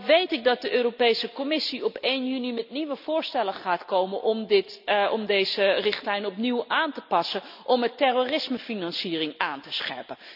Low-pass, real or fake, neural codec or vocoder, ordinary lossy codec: 5.4 kHz; real; none; none